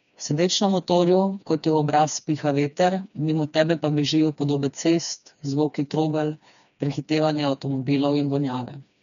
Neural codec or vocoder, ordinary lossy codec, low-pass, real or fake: codec, 16 kHz, 2 kbps, FreqCodec, smaller model; none; 7.2 kHz; fake